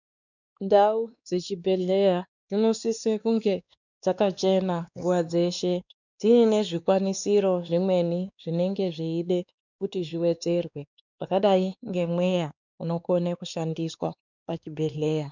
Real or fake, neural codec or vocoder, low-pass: fake; codec, 16 kHz, 2 kbps, X-Codec, WavLM features, trained on Multilingual LibriSpeech; 7.2 kHz